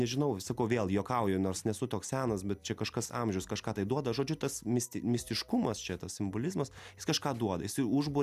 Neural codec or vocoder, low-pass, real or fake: none; 14.4 kHz; real